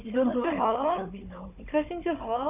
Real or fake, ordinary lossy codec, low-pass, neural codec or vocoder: fake; none; 3.6 kHz; codec, 16 kHz, 4 kbps, FunCodec, trained on Chinese and English, 50 frames a second